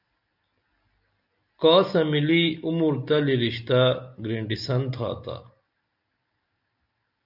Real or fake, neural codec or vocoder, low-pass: real; none; 5.4 kHz